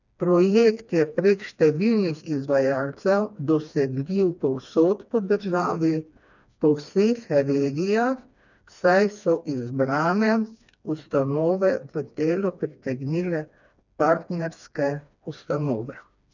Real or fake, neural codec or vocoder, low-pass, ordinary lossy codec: fake; codec, 16 kHz, 2 kbps, FreqCodec, smaller model; 7.2 kHz; none